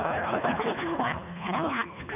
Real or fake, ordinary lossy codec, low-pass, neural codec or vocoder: fake; none; 3.6 kHz; codec, 24 kHz, 1.5 kbps, HILCodec